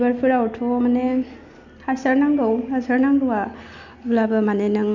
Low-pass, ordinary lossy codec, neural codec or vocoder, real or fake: 7.2 kHz; none; none; real